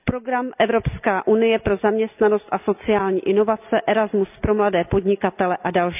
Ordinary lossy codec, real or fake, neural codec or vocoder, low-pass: none; real; none; 3.6 kHz